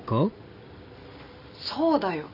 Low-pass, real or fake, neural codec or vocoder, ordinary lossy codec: 5.4 kHz; real; none; none